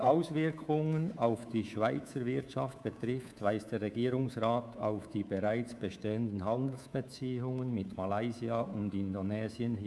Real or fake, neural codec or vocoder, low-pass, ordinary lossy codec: fake; codec, 24 kHz, 3.1 kbps, DualCodec; none; none